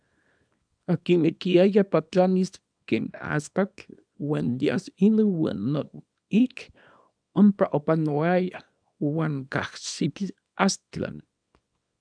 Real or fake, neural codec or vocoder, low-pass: fake; codec, 24 kHz, 0.9 kbps, WavTokenizer, small release; 9.9 kHz